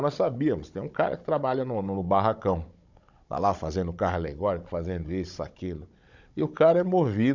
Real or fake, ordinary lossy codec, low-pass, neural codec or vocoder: fake; none; 7.2 kHz; codec, 16 kHz, 16 kbps, FunCodec, trained on Chinese and English, 50 frames a second